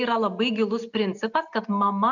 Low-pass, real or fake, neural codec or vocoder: 7.2 kHz; real; none